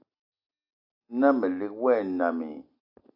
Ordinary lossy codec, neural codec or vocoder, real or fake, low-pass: AAC, 48 kbps; none; real; 5.4 kHz